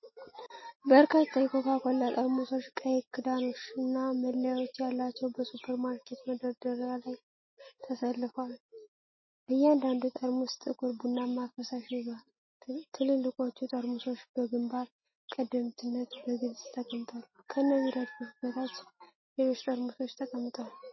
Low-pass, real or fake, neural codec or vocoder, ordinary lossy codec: 7.2 kHz; real; none; MP3, 24 kbps